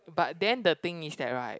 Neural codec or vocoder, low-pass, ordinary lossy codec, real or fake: none; none; none; real